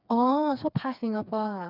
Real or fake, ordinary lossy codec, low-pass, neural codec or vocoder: fake; none; 5.4 kHz; codec, 16 kHz, 4 kbps, FreqCodec, smaller model